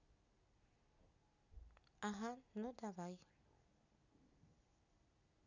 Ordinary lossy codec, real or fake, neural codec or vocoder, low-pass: none; real; none; 7.2 kHz